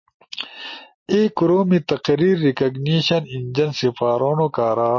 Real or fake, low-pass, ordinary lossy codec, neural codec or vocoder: real; 7.2 kHz; MP3, 32 kbps; none